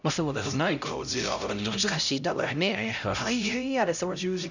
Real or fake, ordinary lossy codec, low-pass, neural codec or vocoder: fake; none; 7.2 kHz; codec, 16 kHz, 0.5 kbps, X-Codec, HuBERT features, trained on LibriSpeech